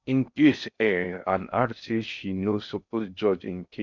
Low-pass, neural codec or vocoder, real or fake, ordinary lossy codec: 7.2 kHz; codec, 16 kHz in and 24 kHz out, 0.6 kbps, FocalCodec, streaming, 4096 codes; fake; none